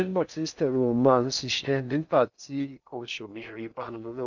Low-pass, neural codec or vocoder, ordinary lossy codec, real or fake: 7.2 kHz; codec, 16 kHz in and 24 kHz out, 0.6 kbps, FocalCodec, streaming, 2048 codes; none; fake